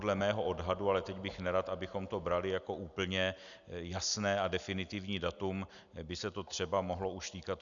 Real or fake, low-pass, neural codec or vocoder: real; 7.2 kHz; none